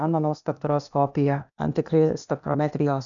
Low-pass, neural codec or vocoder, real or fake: 7.2 kHz; codec, 16 kHz, 0.8 kbps, ZipCodec; fake